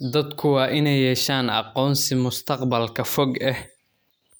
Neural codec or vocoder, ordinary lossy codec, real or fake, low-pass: none; none; real; none